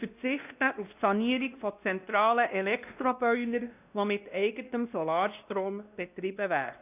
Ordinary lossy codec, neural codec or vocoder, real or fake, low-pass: none; codec, 16 kHz, 1 kbps, X-Codec, WavLM features, trained on Multilingual LibriSpeech; fake; 3.6 kHz